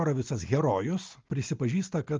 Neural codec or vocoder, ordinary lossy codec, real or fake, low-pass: none; Opus, 32 kbps; real; 7.2 kHz